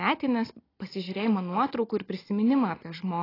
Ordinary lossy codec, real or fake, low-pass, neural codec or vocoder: AAC, 24 kbps; real; 5.4 kHz; none